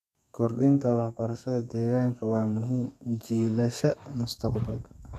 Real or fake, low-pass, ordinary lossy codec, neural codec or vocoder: fake; 14.4 kHz; none; codec, 32 kHz, 1.9 kbps, SNAC